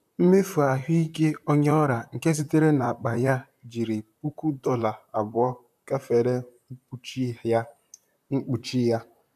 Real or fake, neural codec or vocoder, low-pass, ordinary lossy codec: fake; vocoder, 44.1 kHz, 128 mel bands, Pupu-Vocoder; 14.4 kHz; none